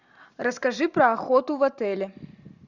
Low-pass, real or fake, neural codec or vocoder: 7.2 kHz; real; none